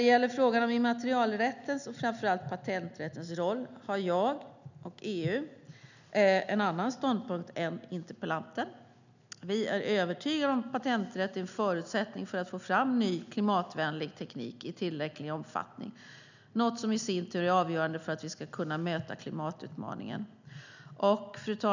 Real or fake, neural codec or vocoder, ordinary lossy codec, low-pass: real; none; none; 7.2 kHz